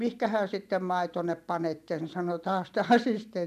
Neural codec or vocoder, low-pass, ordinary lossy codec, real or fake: vocoder, 44.1 kHz, 128 mel bands every 512 samples, BigVGAN v2; 14.4 kHz; none; fake